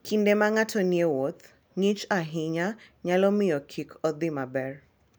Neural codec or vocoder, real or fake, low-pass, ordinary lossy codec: none; real; none; none